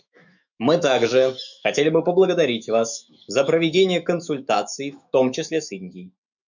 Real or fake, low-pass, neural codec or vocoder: fake; 7.2 kHz; autoencoder, 48 kHz, 128 numbers a frame, DAC-VAE, trained on Japanese speech